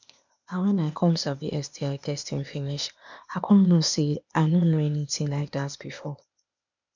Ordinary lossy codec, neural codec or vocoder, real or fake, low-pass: none; codec, 16 kHz, 0.8 kbps, ZipCodec; fake; 7.2 kHz